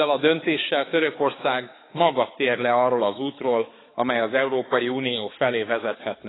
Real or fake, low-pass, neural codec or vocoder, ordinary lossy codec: fake; 7.2 kHz; codec, 16 kHz, 4 kbps, X-Codec, HuBERT features, trained on balanced general audio; AAC, 16 kbps